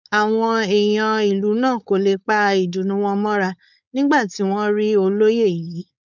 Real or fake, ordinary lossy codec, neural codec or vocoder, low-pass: fake; none; codec, 16 kHz, 4.8 kbps, FACodec; 7.2 kHz